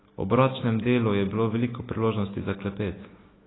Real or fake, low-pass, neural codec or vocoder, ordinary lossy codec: fake; 7.2 kHz; autoencoder, 48 kHz, 128 numbers a frame, DAC-VAE, trained on Japanese speech; AAC, 16 kbps